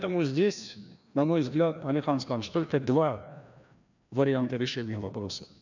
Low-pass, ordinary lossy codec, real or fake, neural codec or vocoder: 7.2 kHz; none; fake; codec, 16 kHz, 1 kbps, FreqCodec, larger model